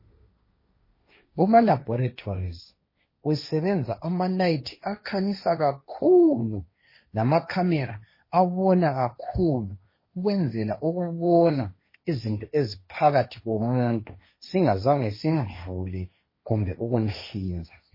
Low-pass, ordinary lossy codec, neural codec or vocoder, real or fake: 5.4 kHz; MP3, 24 kbps; codec, 16 kHz, 1.1 kbps, Voila-Tokenizer; fake